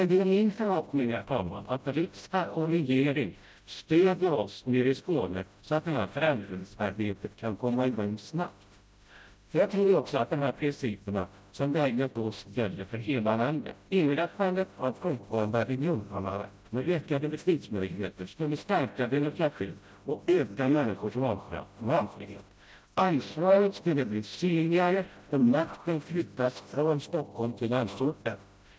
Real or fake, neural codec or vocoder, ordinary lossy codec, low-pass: fake; codec, 16 kHz, 0.5 kbps, FreqCodec, smaller model; none; none